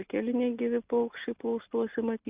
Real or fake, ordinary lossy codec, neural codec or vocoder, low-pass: real; Opus, 64 kbps; none; 3.6 kHz